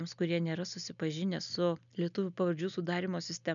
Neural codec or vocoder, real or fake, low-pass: none; real; 7.2 kHz